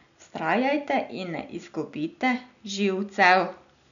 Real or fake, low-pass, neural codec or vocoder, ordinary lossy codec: real; 7.2 kHz; none; none